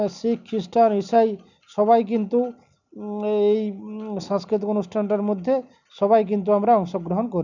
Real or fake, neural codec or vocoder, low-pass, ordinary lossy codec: real; none; 7.2 kHz; none